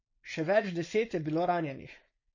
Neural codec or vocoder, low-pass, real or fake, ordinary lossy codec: codec, 16 kHz, 4.8 kbps, FACodec; 7.2 kHz; fake; MP3, 32 kbps